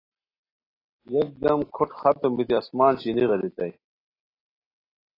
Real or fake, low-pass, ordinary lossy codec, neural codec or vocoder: real; 5.4 kHz; AAC, 24 kbps; none